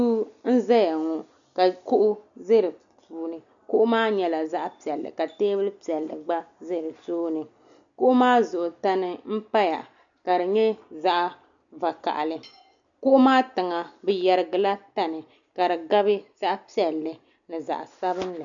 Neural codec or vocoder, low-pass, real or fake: none; 7.2 kHz; real